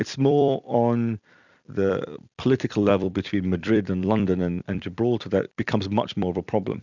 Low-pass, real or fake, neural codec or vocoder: 7.2 kHz; fake; vocoder, 44.1 kHz, 128 mel bands every 256 samples, BigVGAN v2